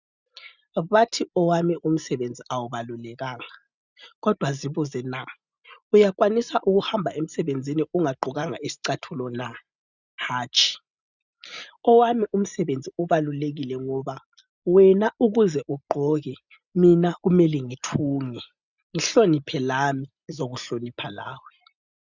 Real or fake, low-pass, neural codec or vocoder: real; 7.2 kHz; none